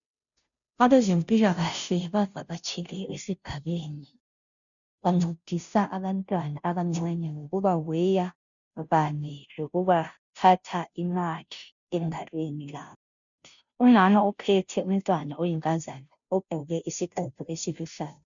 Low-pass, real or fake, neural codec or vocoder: 7.2 kHz; fake; codec, 16 kHz, 0.5 kbps, FunCodec, trained on Chinese and English, 25 frames a second